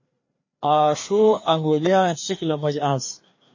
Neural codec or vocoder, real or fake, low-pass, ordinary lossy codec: codec, 16 kHz, 2 kbps, FreqCodec, larger model; fake; 7.2 kHz; MP3, 32 kbps